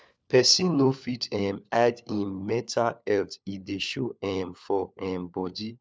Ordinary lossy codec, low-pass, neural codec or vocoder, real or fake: none; none; codec, 16 kHz, 4 kbps, FunCodec, trained on LibriTTS, 50 frames a second; fake